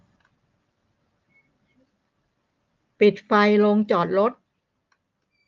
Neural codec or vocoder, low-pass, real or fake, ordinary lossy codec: none; 7.2 kHz; real; Opus, 32 kbps